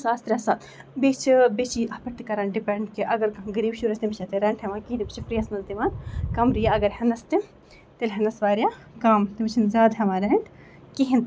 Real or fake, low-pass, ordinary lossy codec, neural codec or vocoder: real; none; none; none